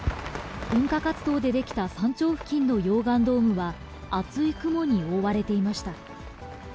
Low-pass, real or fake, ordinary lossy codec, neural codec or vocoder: none; real; none; none